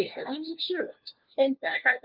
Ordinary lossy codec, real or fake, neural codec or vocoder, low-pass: Opus, 24 kbps; fake; codec, 16 kHz, 1 kbps, FunCodec, trained on LibriTTS, 50 frames a second; 5.4 kHz